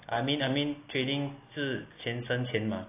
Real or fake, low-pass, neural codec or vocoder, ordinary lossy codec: real; 3.6 kHz; none; AAC, 24 kbps